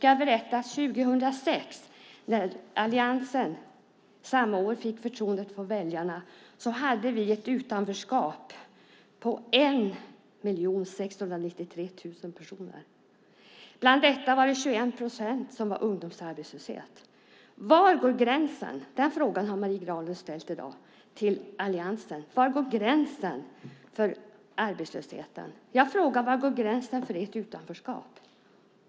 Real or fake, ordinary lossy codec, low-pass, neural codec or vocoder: real; none; none; none